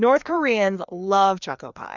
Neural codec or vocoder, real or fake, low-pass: codec, 16 kHz, 2 kbps, FreqCodec, larger model; fake; 7.2 kHz